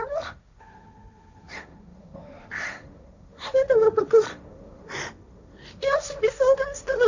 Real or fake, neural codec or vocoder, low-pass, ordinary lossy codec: fake; codec, 16 kHz, 1.1 kbps, Voila-Tokenizer; 7.2 kHz; none